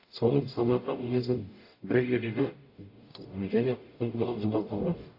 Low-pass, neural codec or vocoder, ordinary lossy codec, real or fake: 5.4 kHz; codec, 44.1 kHz, 0.9 kbps, DAC; AAC, 32 kbps; fake